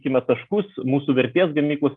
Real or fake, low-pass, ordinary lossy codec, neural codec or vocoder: real; 10.8 kHz; Opus, 32 kbps; none